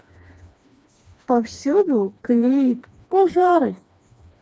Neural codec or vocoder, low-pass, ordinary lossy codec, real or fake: codec, 16 kHz, 2 kbps, FreqCodec, smaller model; none; none; fake